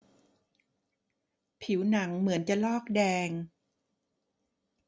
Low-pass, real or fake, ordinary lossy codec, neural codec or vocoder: none; real; none; none